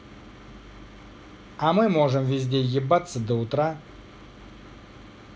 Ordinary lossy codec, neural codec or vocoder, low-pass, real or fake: none; none; none; real